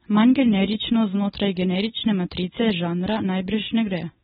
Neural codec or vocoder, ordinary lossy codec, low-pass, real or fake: none; AAC, 16 kbps; 7.2 kHz; real